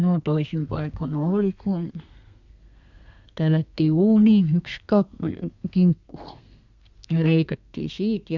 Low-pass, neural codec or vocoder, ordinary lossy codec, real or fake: 7.2 kHz; codec, 32 kHz, 1.9 kbps, SNAC; Opus, 64 kbps; fake